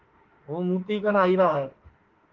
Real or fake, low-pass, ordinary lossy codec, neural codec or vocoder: fake; 7.2 kHz; Opus, 16 kbps; autoencoder, 48 kHz, 32 numbers a frame, DAC-VAE, trained on Japanese speech